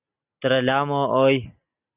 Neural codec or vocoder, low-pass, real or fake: none; 3.6 kHz; real